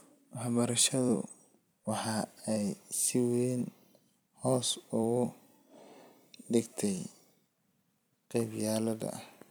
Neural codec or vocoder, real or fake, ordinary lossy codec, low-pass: vocoder, 44.1 kHz, 128 mel bands every 256 samples, BigVGAN v2; fake; none; none